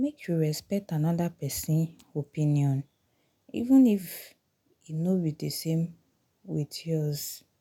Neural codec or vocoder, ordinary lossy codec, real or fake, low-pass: none; none; real; 19.8 kHz